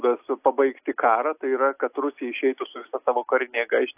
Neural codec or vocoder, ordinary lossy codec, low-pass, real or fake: none; AAC, 32 kbps; 3.6 kHz; real